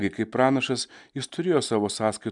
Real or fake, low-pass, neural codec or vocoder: real; 10.8 kHz; none